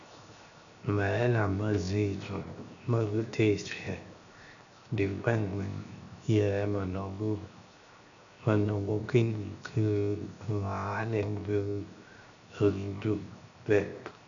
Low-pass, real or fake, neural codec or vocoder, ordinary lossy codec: 7.2 kHz; fake; codec, 16 kHz, 0.7 kbps, FocalCodec; MP3, 96 kbps